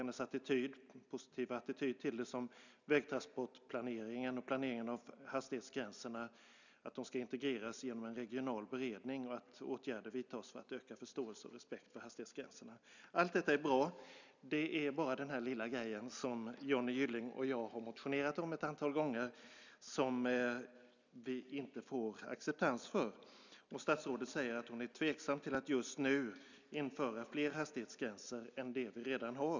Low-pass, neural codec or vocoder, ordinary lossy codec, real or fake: 7.2 kHz; none; none; real